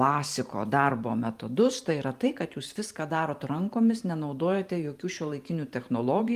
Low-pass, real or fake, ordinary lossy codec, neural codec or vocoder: 14.4 kHz; fake; Opus, 32 kbps; vocoder, 44.1 kHz, 128 mel bands every 512 samples, BigVGAN v2